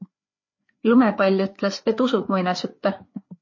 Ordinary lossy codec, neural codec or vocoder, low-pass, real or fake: MP3, 32 kbps; codec, 16 kHz, 4 kbps, FreqCodec, larger model; 7.2 kHz; fake